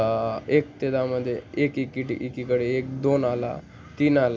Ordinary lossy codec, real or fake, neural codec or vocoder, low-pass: none; real; none; none